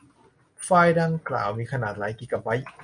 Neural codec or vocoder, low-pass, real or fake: none; 9.9 kHz; real